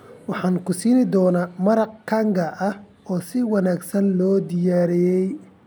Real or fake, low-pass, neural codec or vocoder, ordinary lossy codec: real; none; none; none